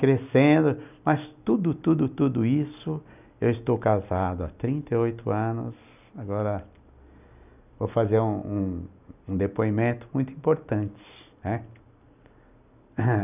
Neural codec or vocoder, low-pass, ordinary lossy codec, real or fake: none; 3.6 kHz; none; real